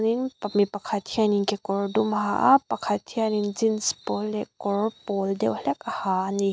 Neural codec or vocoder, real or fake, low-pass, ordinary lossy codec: none; real; none; none